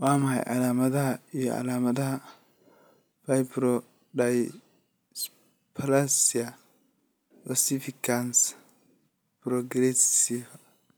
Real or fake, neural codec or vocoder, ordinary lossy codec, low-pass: real; none; none; none